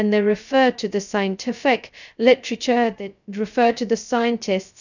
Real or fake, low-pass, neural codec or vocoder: fake; 7.2 kHz; codec, 16 kHz, 0.2 kbps, FocalCodec